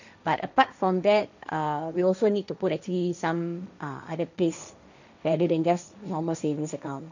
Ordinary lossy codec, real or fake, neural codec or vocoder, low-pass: none; fake; codec, 16 kHz, 1.1 kbps, Voila-Tokenizer; 7.2 kHz